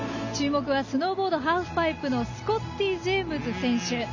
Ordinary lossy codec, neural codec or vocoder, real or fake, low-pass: none; none; real; 7.2 kHz